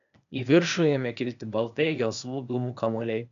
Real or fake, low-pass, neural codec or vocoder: fake; 7.2 kHz; codec, 16 kHz, 0.8 kbps, ZipCodec